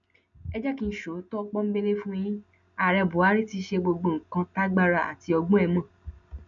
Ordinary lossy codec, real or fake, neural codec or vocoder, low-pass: none; real; none; 7.2 kHz